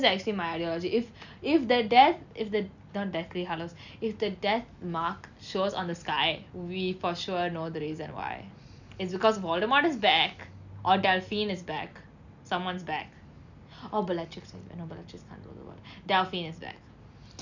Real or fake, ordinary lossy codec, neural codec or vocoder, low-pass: real; none; none; 7.2 kHz